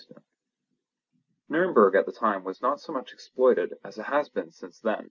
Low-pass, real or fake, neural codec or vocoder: 7.2 kHz; real; none